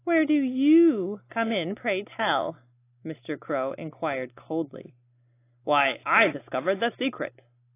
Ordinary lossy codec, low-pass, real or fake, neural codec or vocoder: AAC, 24 kbps; 3.6 kHz; real; none